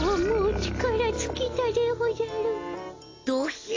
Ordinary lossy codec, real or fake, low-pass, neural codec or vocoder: AAC, 32 kbps; real; 7.2 kHz; none